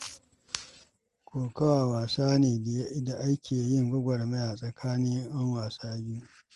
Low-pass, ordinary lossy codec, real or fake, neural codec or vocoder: 10.8 kHz; Opus, 24 kbps; real; none